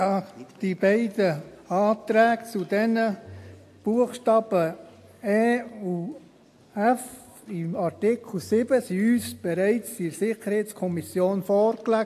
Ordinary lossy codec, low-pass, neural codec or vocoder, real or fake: AAC, 64 kbps; 14.4 kHz; none; real